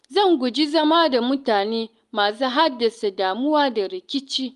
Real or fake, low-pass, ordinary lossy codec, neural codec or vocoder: real; 10.8 kHz; Opus, 32 kbps; none